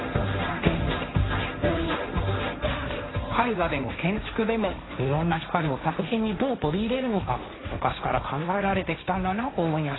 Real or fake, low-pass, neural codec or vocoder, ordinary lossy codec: fake; 7.2 kHz; codec, 16 kHz, 1.1 kbps, Voila-Tokenizer; AAC, 16 kbps